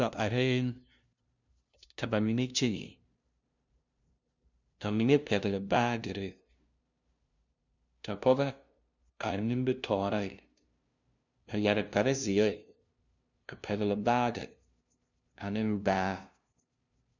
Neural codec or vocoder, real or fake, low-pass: codec, 16 kHz, 0.5 kbps, FunCodec, trained on LibriTTS, 25 frames a second; fake; 7.2 kHz